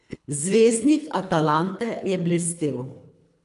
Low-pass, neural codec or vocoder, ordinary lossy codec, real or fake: 10.8 kHz; codec, 24 kHz, 3 kbps, HILCodec; none; fake